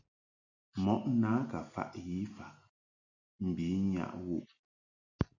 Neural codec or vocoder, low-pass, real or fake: none; 7.2 kHz; real